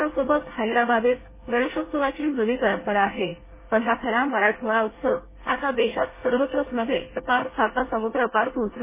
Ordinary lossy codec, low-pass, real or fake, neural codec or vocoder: MP3, 16 kbps; 3.6 kHz; fake; codec, 24 kHz, 1 kbps, SNAC